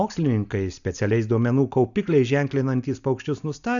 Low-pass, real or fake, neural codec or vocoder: 7.2 kHz; real; none